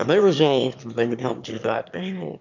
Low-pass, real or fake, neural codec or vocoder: 7.2 kHz; fake; autoencoder, 22.05 kHz, a latent of 192 numbers a frame, VITS, trained on one speaker